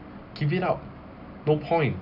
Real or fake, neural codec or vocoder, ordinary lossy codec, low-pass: real; none; none; 5.4 kHz